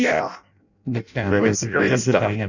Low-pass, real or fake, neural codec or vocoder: 7.2 kHz; fake; codec, 16 kHz in and 24 kHz out, 0.6 kbps, FireRedTTS-2 codec